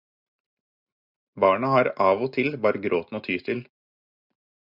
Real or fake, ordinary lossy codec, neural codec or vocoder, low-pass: real; Opus, 64 kbps; none; 5.4 kHz